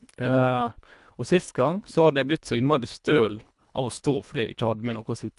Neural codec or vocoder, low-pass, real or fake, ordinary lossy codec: codec, 24 kHz, 1.5 kbps, HILCodec; 10.8 kHz; fake; AAC, 96 kbps